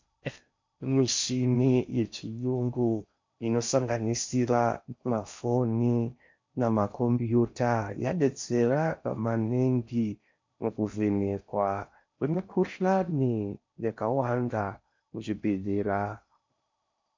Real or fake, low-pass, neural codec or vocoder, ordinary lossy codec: fake; 7.2 kHz; codec, 16 kHz in and 24 kHz out, 0.6 kbps, FocalCodec, streaming, 4096 codes; MP3, 64 kbps